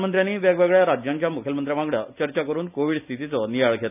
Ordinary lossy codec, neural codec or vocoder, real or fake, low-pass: none; none; real; 3.6 kHz